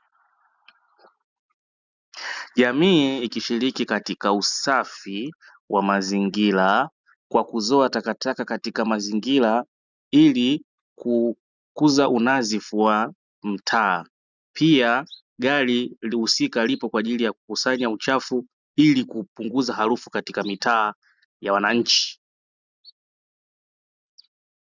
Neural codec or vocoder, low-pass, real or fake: none; 7.2 kHz; real